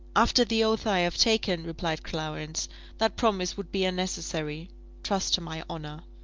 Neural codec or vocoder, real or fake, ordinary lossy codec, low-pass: none; real; Opus, 32 kbps; 7.2 kHz